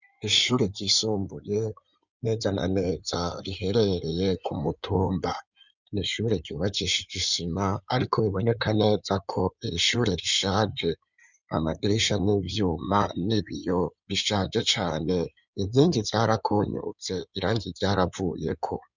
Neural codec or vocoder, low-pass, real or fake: codec, 16 kHz in and 24 kHz out, 2.2 kbps, FireRedTTS-2 codec; 7.2 kHz; fake